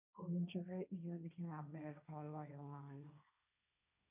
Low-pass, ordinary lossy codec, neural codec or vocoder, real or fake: 3.6 kHz; none; codec, 16 kHz, 1.1 kbps, Voila-Tokenizer; fake